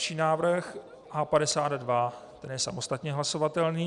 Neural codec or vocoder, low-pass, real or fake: none; 10.8 kHz; real